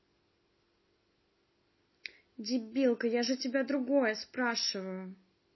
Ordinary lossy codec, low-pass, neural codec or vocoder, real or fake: MP3, 24 kbps; 7.2 kHz; none; real